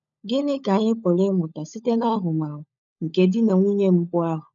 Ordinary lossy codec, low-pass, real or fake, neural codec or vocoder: none; 7.2 kHz; fake; codec, 16 kHz, 16 kbps, FunCodec, trained on LibriTTS, 50 frames a second